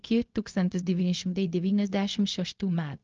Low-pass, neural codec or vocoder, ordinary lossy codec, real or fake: 7.2 kHz; codec, 16 kHz, 0.4 kbps, LongCat-Audio-Codec; Opus, 24 kbps; fake